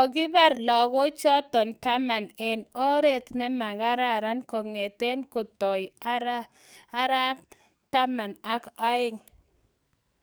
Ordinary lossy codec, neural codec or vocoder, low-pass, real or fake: none; codec, 44.1 kHz, 2.6 kbps, SNAC; none; fake